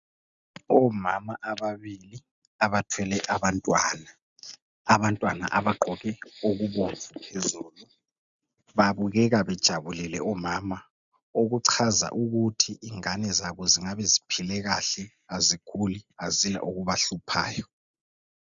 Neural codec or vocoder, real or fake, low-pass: none; real; 7.2 kHz